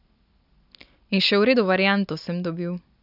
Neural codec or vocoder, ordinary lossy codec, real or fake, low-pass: none; none; real; 5.4 kHz